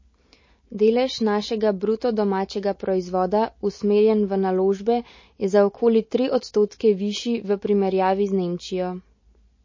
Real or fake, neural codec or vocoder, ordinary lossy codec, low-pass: real; none; MP3, 32 kbps; 7.2 kHz